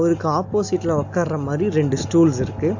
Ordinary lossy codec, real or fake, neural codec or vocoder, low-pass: AAC, 48 kbps; real; none; 7.2 kHz